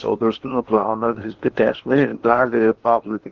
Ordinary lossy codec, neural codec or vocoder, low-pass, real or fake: Opus, 16 kbps; codec, 16 kHz in and 24 kHz out, 0.8 kbps, FocalCodec, streaming, 65536 codes; 7.2 kHz; fake